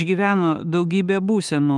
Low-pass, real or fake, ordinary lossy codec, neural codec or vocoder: 10.8 kHz; fake; Opus, 32 kbps; autoencoder, 48 kHz, 32 numbers a frame, DAC-VAE, trained on Japanese speech